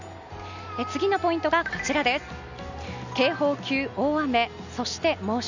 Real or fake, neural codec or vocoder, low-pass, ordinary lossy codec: real; none; 7.2 kHz; none